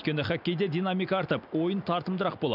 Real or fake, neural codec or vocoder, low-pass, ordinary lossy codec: real; none; 5.4 kHz; none